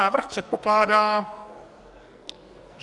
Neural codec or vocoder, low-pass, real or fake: codec, 44.1 kHz, 2.6 kbps, SNAC; 10.8 kHz; fake